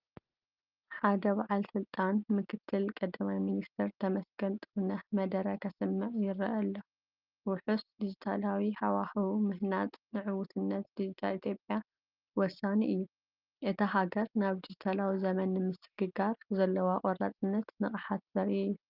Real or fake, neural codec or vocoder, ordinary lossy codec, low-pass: real; none; Opus, 24 kbps; 5.4 kHz